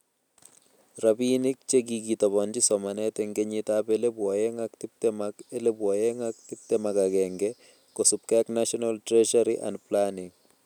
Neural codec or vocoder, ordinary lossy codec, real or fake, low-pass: none; none; real; 19.8 kHz